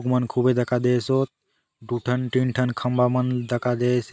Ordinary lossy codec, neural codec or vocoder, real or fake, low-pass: none; none; real; none